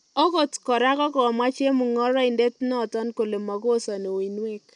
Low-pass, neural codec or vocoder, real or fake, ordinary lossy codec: 10.8 kHz; none; real; none